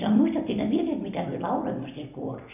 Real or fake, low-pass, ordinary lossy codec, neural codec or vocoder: real; 3.6 kHz; none; none